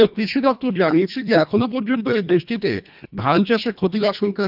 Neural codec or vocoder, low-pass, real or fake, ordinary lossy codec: codec, 24 kHz, 1.5 kbps, HILCodec; 5.4 kHz; fake; none